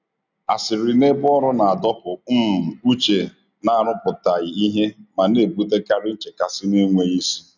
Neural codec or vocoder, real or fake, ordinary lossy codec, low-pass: none; real; none; 7.2 kHz